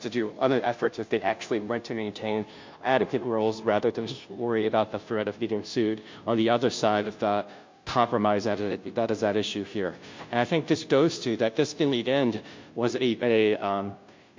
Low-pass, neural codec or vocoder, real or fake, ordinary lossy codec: 7.2 kHz; codec, 16 kHz, 0.5 kbps, FunCodec, trained on Chinese and English, 25 frames a second; fake; MP3, 48 kbps